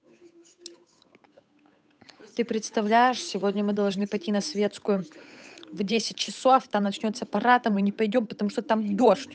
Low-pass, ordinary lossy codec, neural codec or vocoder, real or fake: none; none; codec, 16 kHz, 8 kbps, FunCodec, trained on Chinese and English, 25 frames a second; fake